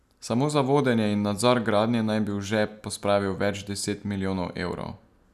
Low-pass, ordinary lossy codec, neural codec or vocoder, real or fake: 14.4 kHz; none; none; real